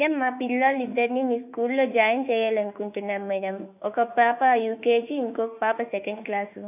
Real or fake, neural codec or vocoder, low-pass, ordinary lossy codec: fake; autoencoder, 48 kHz, 32 numbers a frame, DAC-VAE, trained on Japanese speech; 3.6 kHz; none